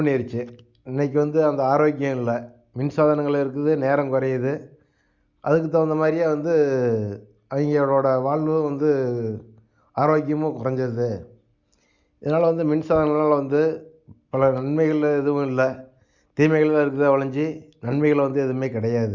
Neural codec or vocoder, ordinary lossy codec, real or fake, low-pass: none; Opus, 64 kbps; real; 7.2 kHz